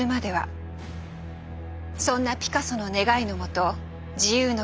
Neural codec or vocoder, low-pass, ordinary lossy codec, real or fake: none; none; none; real